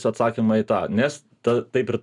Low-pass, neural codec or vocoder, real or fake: 10.8 kHz; none; real